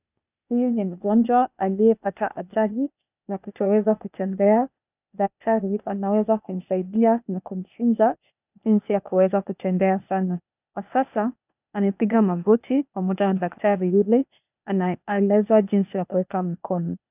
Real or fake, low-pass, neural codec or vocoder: fake; 3.6 kHz; codec, 16 kHz, 0.8 kbps, ZipCodec